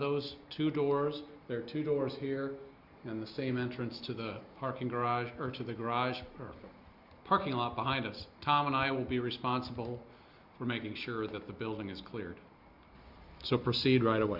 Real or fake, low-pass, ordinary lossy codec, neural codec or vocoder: real; 5.4 kHz; Opus, 64 kbps; none